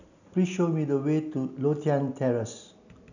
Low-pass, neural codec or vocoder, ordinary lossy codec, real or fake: 7.2 kHz; none; none; real